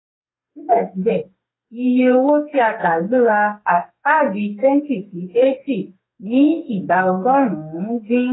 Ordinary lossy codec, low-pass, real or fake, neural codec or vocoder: AAC, 16 kbps; 7.2 kHz; fake; codec, 32 kHz, 1.9 kbps, SNAC